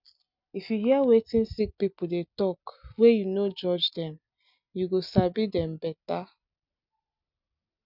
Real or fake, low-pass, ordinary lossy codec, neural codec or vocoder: real; 5.4 kHz; none; none